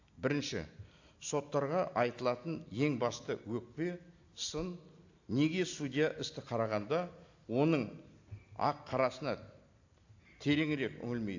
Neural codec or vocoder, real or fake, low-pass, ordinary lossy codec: none; real; 7.2 kHz; AAC, 48 kbps